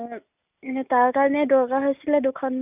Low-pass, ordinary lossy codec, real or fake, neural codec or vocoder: 3.6 kHz; none; real; none